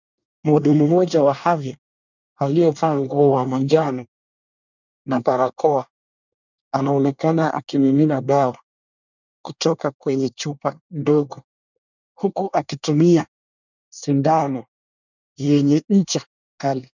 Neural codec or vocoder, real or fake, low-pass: codec, 24 kHz, 1 kbps, SNAC; fake; 7.2 kHz